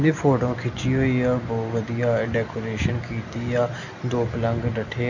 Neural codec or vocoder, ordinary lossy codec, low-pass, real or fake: none; none; 7.2 kHz; real